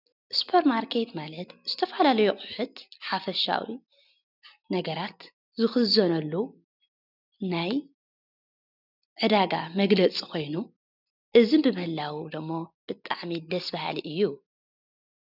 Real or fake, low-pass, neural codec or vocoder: real; 5.4 kHz; none